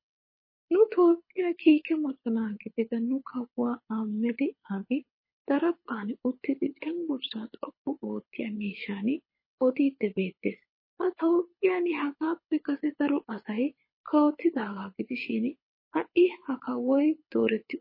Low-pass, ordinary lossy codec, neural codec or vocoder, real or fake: 5.4 kHz; MP3, 24 kbps; codec, 24 kHz, 6 kbps, HILCodec; fake